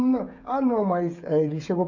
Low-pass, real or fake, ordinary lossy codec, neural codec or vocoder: 7.2 kHz; fake; none; codec, 16 kHz, 16 kbps, FreqCodec, smaller model